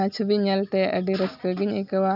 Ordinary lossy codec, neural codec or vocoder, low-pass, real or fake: none; none; 5.4 kHz; real